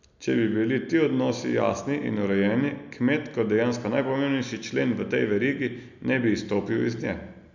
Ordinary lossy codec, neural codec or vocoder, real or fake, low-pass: none; none; real; 7.2 kHz